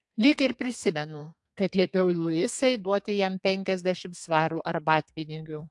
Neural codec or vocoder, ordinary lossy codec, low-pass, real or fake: codec, 32 kHz, 1.9 kbps, SNAC; AAC, 64 kbps; 10.8 kHz; fake